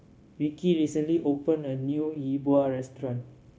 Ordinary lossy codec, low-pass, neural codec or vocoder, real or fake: none; none; codec, 16 kHz, 0.9 kbps, LongCat-Audio-Codec; fake